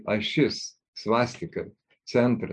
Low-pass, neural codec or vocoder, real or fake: 9.9 kHz; none; real